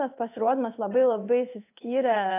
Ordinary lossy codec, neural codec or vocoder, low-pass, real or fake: AAC, 24 kbps; codec, 16 kHz in and 24 kHz out, 1 kbps, XY-Tokenizer; 3.6 kHz; fake